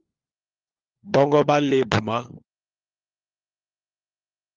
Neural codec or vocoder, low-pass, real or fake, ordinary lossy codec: codec, 16 kHz, 4 kbps, FunCodec, trained on LibriTTS, 50 frames a second; 7.2 kHz; fake; Opus, 32 kbps